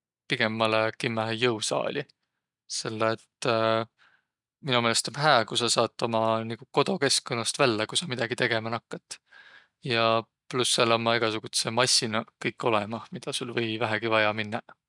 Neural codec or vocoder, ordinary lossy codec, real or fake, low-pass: none; none; real; 10.8 kHz